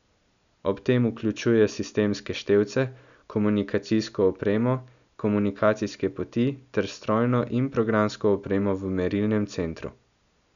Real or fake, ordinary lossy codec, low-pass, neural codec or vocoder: real; none; 7.2 kHz; none